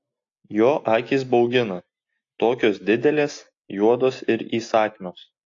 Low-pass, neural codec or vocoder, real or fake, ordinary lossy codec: 7.2 kHz; none; real; AAC, 48 kbps